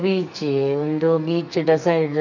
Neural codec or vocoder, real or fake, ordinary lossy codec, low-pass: codec, 44.1 kHz, 2.6 kbps, SNAC; fake; none; 7.2 kHz